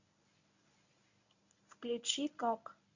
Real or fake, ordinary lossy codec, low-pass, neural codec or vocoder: fake; none; 7.2 kHz; codec, 24 kHz, 0.9 kbps, WavTokenizer, medium speech release version 1